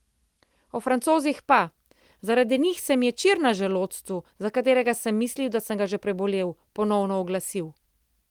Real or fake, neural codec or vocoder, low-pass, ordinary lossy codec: real; none; 19.8 kHz; Opus, 24 kbps